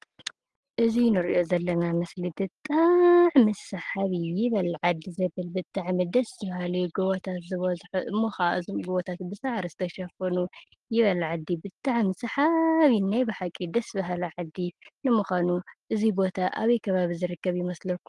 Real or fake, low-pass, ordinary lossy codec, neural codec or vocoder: real; 10.8 kHz; Opus, 24 kbps; none